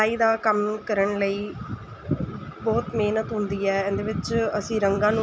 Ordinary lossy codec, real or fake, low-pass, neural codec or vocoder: none; real; none; none